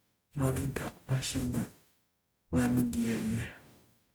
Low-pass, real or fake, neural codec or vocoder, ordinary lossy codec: none; fake; codec, 44.1 kHz, 0.9 kbps, DAC; none